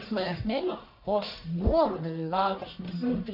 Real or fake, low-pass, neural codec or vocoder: fake; 5.4 kHz; codec, 44.1 kHz, 1.7 kbps, Pupu-Codec